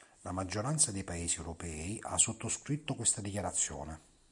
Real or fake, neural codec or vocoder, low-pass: real; none; 10.8 kHz